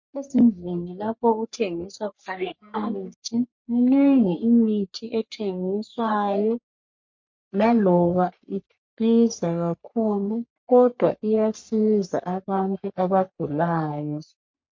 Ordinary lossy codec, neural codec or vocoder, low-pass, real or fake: MP3, 48 kbps; codec, 44.1 kHz, 3.4 kbps, Pupu-Codec; 7.2 kHz; fake